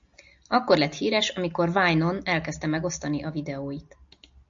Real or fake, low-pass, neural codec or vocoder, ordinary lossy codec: real; 7.2 kHz; none; AAC, 64 kbps